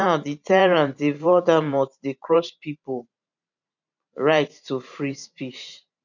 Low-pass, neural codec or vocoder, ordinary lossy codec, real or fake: 7.2 kHz; vocoder, 44.1 kHz, 128 mel bands every 512 samples, BigVGAN v2; none; fake